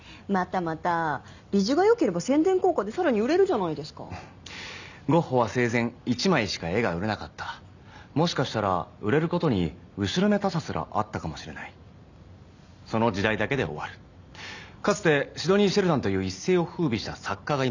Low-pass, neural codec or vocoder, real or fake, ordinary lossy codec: 7.2 kHz; none; real; none